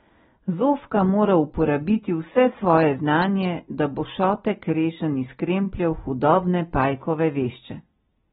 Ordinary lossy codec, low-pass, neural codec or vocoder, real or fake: AAC, 16 kbps; 19.8 kHz; none; real